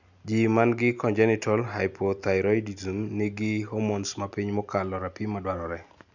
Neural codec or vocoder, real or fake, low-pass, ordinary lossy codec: none; real; 7.2 kHz; none